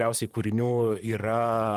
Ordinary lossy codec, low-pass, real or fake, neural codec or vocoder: Opus, 32 kbps; 14.4 kHz; fake; vocoder, 44.1 kHz, 128 mel bands, Pupu-Vocoder